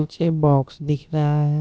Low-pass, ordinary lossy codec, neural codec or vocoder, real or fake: none; none; codec, 16 kHz, about 1 kbps, DyCAST, with the encoder's durations; fake